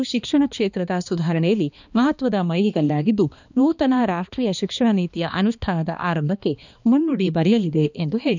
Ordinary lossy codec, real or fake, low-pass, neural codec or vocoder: none; fake; 7.2 kHz; codec, 16 kHz, 2 kbps, X-Codec, HuBERT features, trained on balanced general audio